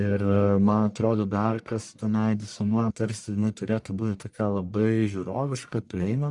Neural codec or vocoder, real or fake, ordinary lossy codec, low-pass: codec, 44.1 kHz, 1.7 kbps, Pupu-Codec; fake; Opus, 64 kbps; 10.8 kHz